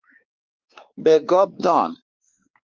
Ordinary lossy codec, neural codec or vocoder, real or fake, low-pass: Opus, 32 kbps; codec, 16 kHz, 2 kbps, X-Codec, WavLM features, trained on Multilingual LibriSpeech; fake; 7.2 kHz